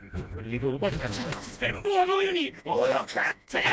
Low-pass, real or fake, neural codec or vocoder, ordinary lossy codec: none; fake; codec, 16 kHz, 1 kbps, FreqCodec, smaller model; none